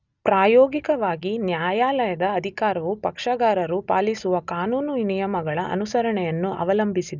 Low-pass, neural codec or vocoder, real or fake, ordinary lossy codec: 7.2 kHz; none; real; none